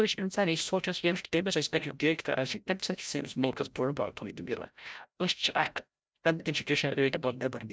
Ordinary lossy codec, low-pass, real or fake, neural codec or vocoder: none; none; fake; codec, 16 kHz, 0.5 kbps, FreqCodec, larger model